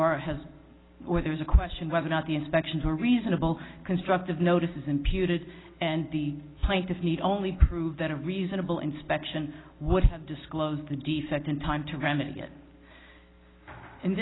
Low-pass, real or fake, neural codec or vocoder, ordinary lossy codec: 7.2 kHz; real; none; AAC, 16 kbps